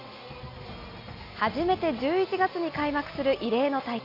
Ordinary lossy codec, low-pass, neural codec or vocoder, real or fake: AAC, 32 kbps; 5.4 kHz; none; real